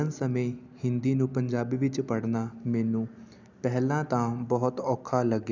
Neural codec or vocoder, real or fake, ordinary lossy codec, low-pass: none; real; none; 7.2 kHz